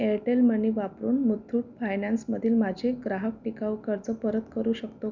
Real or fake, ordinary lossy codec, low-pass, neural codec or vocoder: real; none; 7.2 kHz; none